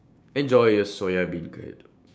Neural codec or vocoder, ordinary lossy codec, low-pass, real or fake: codec, 16 kHz, 6 kbps, DAC; none; none; fake